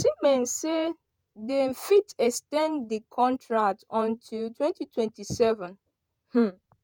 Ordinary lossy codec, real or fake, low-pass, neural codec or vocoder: none; fake; none; vocoder, 48 kHz, 128 mel bands, Vocos